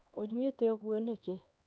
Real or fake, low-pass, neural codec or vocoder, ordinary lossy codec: fake; none; codec, 16 kHz, 2 kbps, X-Codec, HuBERT features, trained on LibriSpeech; none